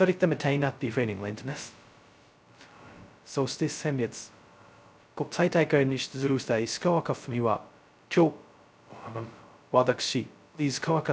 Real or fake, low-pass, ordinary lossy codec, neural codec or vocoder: fake; none; none; codec, 16 kHz, 0.2 kbps, FocalCodec